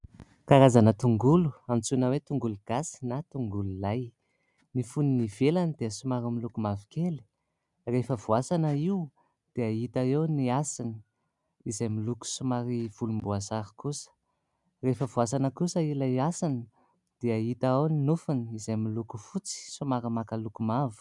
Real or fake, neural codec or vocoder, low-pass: real; none; 10.8 kHz